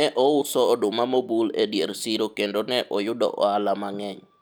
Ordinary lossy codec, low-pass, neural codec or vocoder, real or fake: none; none; none; real